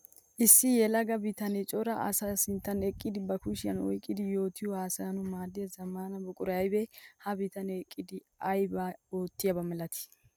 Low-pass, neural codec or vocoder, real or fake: 19.8 kHz; none; real